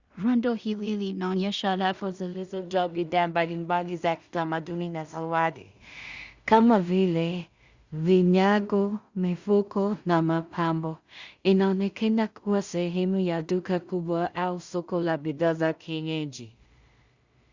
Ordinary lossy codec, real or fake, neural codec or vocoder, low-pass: Opus, 64 kbps; fake; codec, 16 kHz in and 24 kHz out, 0.4 kbps, LongCat-Audio-Codec, two codebook decoder; 7.2 kHz